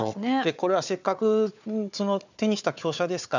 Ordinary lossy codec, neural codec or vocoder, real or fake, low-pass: none; codec, 16 kHz, 4 kbps, FunCodec, trained on Chinese and English, 50 frames a second; fake; 7.2 kHz